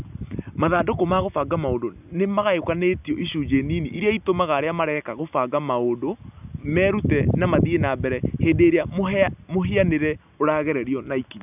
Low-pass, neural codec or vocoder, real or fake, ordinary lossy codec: 3.6 kHz; none; real; none